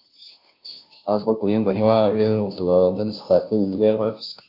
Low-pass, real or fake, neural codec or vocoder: 5.4 kHz; fake; codec, 16 kHz, 0.5 kbps, FunCodec, trained on Chinese and English, 25 frames a second